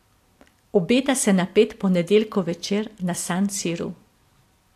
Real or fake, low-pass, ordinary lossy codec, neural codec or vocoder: real; 14.4 kHz; AAC, 64 kbps; none